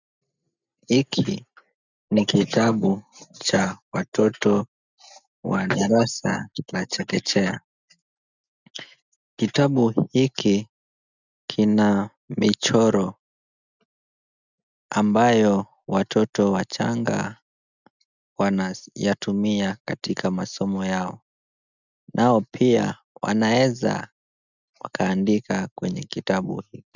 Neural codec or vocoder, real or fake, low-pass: none; real; 7.2 kHz